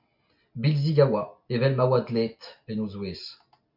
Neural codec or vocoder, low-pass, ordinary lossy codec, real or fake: none; 5.4 kHz; MP3, 48 kbps; real